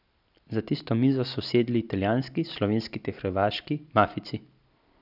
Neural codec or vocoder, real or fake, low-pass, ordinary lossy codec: none; real; 5.4 kHz; none